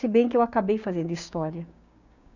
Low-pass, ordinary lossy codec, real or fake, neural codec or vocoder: 7.2 kHz; none; fake; codec, 16 kHz, 6 kbps, DAC